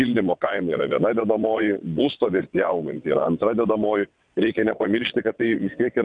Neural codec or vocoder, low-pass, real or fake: vocoder, 22.05 kHz, 80 mel bands, WaveNeXt; 9.9 kHz; fake